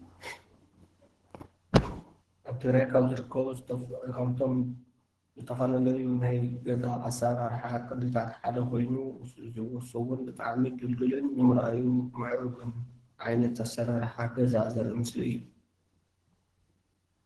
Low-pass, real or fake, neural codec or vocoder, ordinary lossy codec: 10.8 kHz; fake; codec, 24 kHz, 3 kbps, HILCodec; Opus, 16 kbps